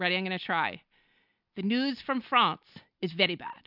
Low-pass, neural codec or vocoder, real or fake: 5.4 kHz; none; real